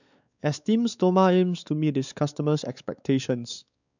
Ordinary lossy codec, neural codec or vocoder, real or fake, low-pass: none; codec, 16 kHz, 4 kbps, X-Codec, WavLM features, trained on Multilingual LibriSpeech; fake; 7.2 kHz